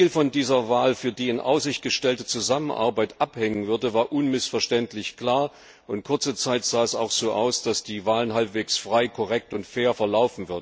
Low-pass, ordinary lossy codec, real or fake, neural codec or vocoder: none; none; real; none